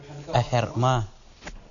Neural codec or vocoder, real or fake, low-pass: none; real; 7.2 kHz